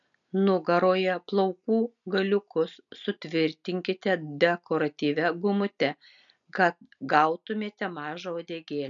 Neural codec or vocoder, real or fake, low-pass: none; real; 7.2 kHz